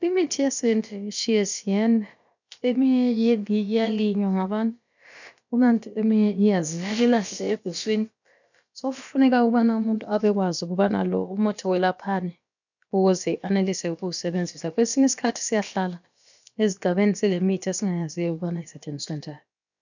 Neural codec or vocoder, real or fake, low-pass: codec, 16 kHz, about 1 kbps, DyCAST, with the encoder's durations; fake; 7.2 kHz